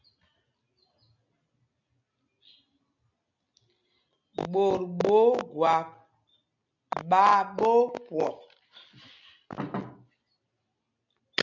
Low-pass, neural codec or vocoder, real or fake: 7.2 kHz; none; real